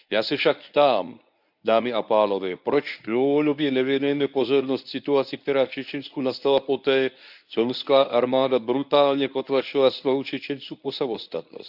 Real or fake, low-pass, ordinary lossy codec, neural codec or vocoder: fake; 5.4 kHz; none; codec, 24 kHz, 0.9 kbps, WavTokenizer, medium speech release version 1